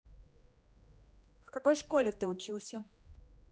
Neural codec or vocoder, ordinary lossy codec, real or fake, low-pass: codec, 16 kHz, 1 kbps, X-Codec, HuBERT features, trained on general audio; none; fake; none